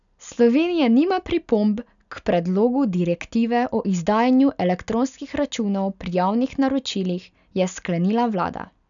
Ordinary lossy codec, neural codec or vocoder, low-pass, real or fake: none; none; 7.2 kHz; real